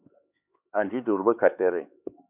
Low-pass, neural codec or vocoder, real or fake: 3.6 kHz; codec, 16 kHz, 4 kbps, X-Codec, HuBERT features, trained on LibriSpeech; fake